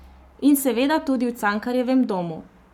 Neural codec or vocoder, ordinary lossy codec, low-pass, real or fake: codec, 44.1 kHz, 7.8 kbps, Pupu-Codec; none; 19.8 kHz; fake